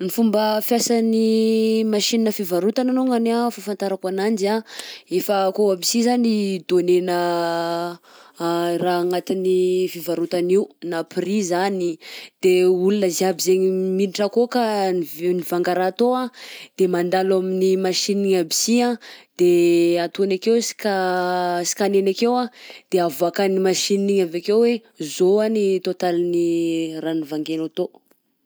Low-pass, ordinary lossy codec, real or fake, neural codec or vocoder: none; none; real; none